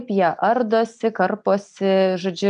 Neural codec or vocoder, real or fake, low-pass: none; real; 9.9 kHz